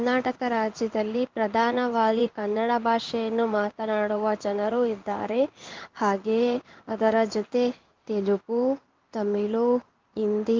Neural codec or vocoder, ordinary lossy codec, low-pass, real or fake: codec, 16 kHz in and 24 kHz out, 1 kbps, XY-Tokenizer; Opus, 24 kbps; 7.2 kHz; fake